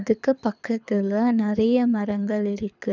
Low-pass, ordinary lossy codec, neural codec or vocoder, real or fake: 7.2 kHz; none; codec, 24 kHz, 6 kbps, HILCodec; fake